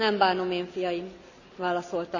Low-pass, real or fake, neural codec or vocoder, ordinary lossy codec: 7.2 kHz; real; none; none